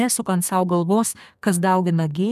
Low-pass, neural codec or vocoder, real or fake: 14.4 kHz; codec, 32 kHz, 1.9 kbps, SNAC; fake